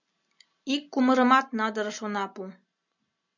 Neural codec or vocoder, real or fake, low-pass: none; real; 7.2 kHz